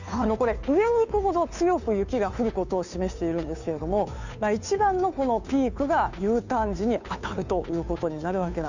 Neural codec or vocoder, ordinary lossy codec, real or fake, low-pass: codec, 16 kHz, 2 kbps, FunCodec, trained on Chinese and English, 25 frames a second; none; fake; 7.2 kHz